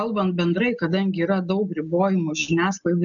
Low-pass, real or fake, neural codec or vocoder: 7.2 kHz; real; none